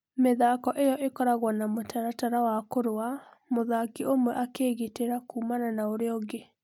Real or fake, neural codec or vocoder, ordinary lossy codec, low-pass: real; none; none; 19.8 kHz